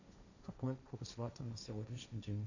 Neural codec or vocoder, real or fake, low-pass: codec, 16 kHz, 1.1 kbps, Voila-Tokenizer; fake; 7.2 kHz